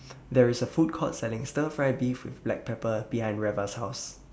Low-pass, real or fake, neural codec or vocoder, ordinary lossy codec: none; real; none; none